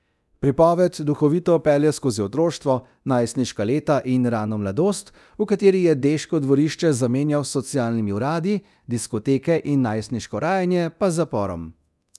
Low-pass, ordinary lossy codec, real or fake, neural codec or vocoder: none; none; fake; codec, 24 kHz, 0.9 kbps, DualCodec